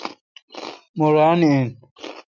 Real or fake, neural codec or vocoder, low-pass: real; none; 7.2 kHz